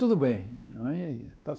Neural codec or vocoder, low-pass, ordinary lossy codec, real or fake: codec, 16 kHz, 2 kbps, X-Codec, WavLM features, trained on Multilingual LibriSpeech; none; none; fake